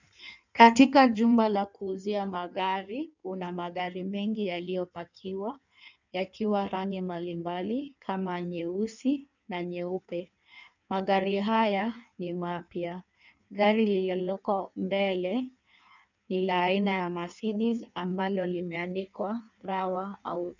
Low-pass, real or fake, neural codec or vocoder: 7.2 kHz; fake; codec, 16 kHz in and 24 kHz out, 1.1 kbps, FireRedTTS-2 codec